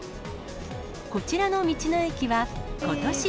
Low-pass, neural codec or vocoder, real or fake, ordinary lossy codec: none; none; real; none